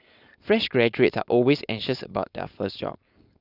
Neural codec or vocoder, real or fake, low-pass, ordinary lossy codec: codec, 16 kHz, 4.8 kbps, FACodec; fake; 5.4 kHz; none